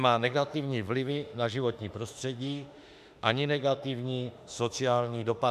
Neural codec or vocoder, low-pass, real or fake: autoencoder, 48 kHz, 32 numbers a frame, DAC-VAE, trained on Japanese speech; 14.4 kHz; fake